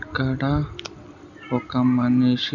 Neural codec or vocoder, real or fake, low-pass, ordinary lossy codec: none; real; 7.2 kHz; none